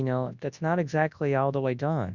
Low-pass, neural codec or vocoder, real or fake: 7.2 kHz; codec, 24 kHz, 0.9 kbps, WavTokenizer, large speech release; fake